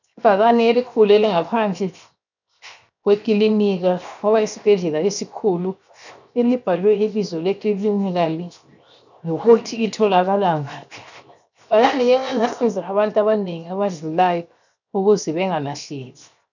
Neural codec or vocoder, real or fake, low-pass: codec, 16 kHz, 0.7 kbps, FocalCodec; fake; 7.2 kHz